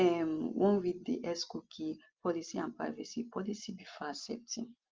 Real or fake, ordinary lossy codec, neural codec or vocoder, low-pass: real; Opus, 32 kbps; none; 7.2 kHz